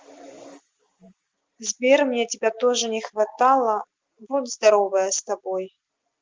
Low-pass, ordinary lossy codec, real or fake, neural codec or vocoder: 7.2 kHz; Opus, 32 kbps; real; none